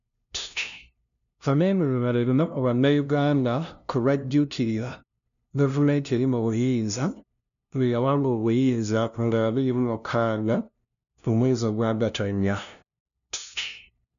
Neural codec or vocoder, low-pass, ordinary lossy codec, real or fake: codec, 16 kHz, 0.5 kbps, FunCodec, trained on LibriTTS, 25 frames a second; 7.2 kHz; none; fake